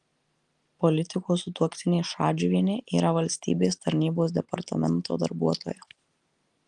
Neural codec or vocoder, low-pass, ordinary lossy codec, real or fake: none; 10.8 kHz; Opus, 24 kbps; real